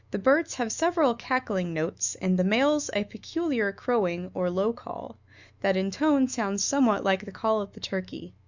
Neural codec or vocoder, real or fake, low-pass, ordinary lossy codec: autoencoder, 48 kHz, 128 numbers a frame, DAC-VAE, trained on Japanese speech; fake; 7.2 kHz; Opus, 64 kbps